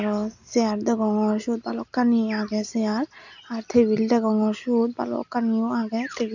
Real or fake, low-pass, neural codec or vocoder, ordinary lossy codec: real; 7.2 kHz; none; none